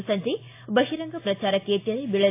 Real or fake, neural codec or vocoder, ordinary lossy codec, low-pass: real; none; AAC, 24 kbps; 3.6 kHz